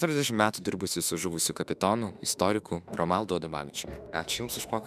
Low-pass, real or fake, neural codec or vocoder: 14.4 kHz; fake; autoencoder, 48 kHz, 32 numbers a frame, DAC-VAE, trained on Japanese speech